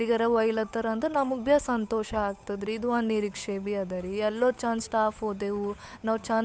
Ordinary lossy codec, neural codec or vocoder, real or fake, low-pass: none; codec, 16 kHz, 8 kbps, FunCodec, trained on Chinese and English, 25 frames a second; fake; none